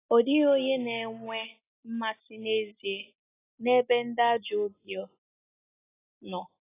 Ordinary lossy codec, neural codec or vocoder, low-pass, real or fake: AAC, 16 kbps; none; 3.6 kHz; real